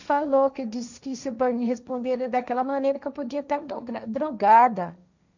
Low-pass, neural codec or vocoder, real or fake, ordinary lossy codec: 7.2 kHz; codec, 16 kHz, 1.1 kbps, Voila-Tokenizer; fake; none